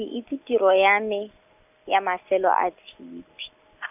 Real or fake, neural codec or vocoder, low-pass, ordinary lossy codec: real; none; 3.6 kHz; none